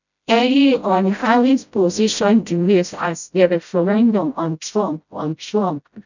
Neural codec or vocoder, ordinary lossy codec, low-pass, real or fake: codec, 16 kHz, 0.5 kbps, FreqCodec, smaller model; none; 7.2 kHz; fake